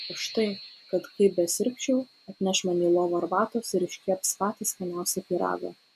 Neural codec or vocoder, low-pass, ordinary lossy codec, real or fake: none; 14.4 kHz; AAC, 96 kbps; real